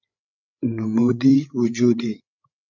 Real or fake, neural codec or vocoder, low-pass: fake; codec, 16 kHz, 8 kbps, FreqCodec, larger model; 7.2 kHz